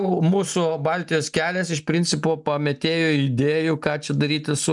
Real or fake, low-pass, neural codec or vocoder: real; 10.8 kHz; none